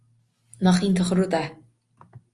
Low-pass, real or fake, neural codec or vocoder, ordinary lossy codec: 10.8 kHz; real; none; Opus, 32 kbps